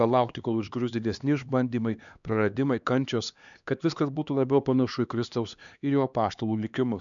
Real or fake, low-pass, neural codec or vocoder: fake; 7.2 kHz; codec, 16 kHz, 2 kbps, X-Codec, HuBERT features, trained on LibriSpeech